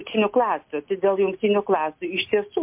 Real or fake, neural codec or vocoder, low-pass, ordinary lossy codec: real; none; 3.6 kHz; MP3, 32 kbps